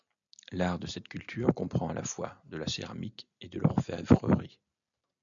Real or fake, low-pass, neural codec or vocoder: real; 7.2 kHz; none